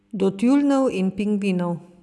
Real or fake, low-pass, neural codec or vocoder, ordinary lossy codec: real; none; none; none